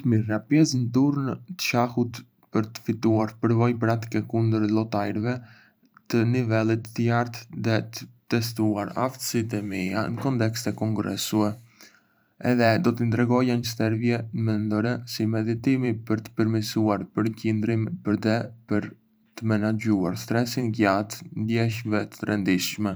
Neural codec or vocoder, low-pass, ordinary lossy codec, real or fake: vocoder, 44.1 kHz, 128 mel bands every 512 samples, BigVGAN v2; none; none; fake